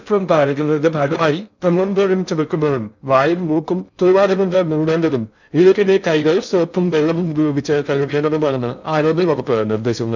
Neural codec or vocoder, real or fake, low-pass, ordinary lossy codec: codec, 16 kHz in and 24 kHz out, 0.6 kbps, FocalCodec, streaming, 2048 codes; fake; 7.2 kHz; none